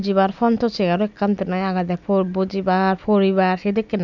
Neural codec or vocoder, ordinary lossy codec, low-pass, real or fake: none; none; 7.2 kHz; real